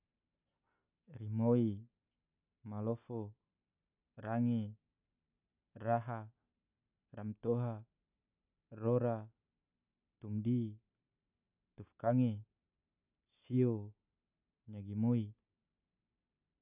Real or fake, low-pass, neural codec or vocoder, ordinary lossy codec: real; 3.6 kHz; none; none